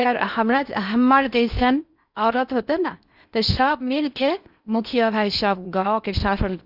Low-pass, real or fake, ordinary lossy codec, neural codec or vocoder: 5.4 kHz; fake; Opus, 64 kbps; codec, 16 kHz in and 24 kHz out, 0.6 kbps, FocalCodec, streaming, 4096 codes